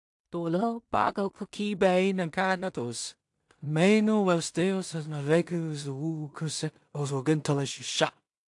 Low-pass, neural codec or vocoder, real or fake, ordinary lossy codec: 10.8 kHz; codec, 16 kHz in and 24 kHz out, 0.4 kbps, LongCat-Audio-Codec, two codebook decoder; fake; AAC, 64 kbps